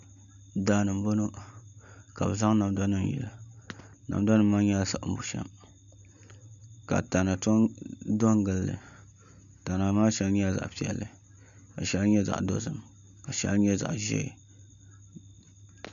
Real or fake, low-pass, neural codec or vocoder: real; 7.2 kHz; none